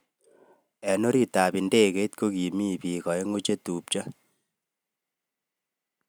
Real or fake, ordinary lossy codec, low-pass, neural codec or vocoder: real; none; none; none